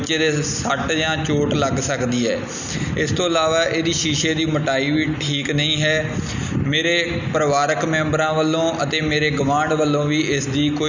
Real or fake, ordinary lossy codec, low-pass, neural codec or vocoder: real; none; 7.2 kHz; none